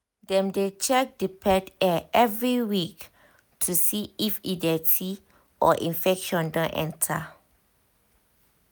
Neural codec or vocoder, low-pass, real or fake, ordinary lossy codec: none; none; real; none